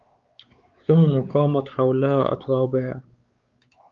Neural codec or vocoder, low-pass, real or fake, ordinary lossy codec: codec, 16 kHz, 4 kbps, X-Codec, WavLM features, trained on Multilingual LibriSpeech; 7.2 kHz; fake; Opus, 32 kbps